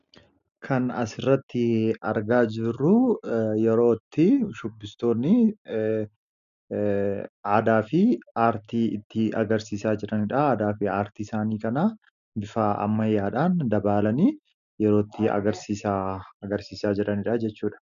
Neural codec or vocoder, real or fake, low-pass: none; real; 7.2 kHz